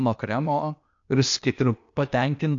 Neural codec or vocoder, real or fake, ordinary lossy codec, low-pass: codec, 16 kHz, 0.8 kbps, ZipCodec; fake; AAC, 64 kbps; 7.2 kHz